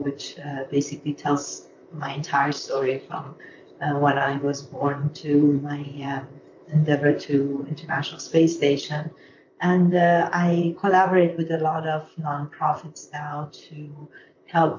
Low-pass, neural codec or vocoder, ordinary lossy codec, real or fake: 7.2 kHz; vocoder, 44.1 kHz, 128 mel bands, Pupu-Vocoder; MP3, 48 kbps; fake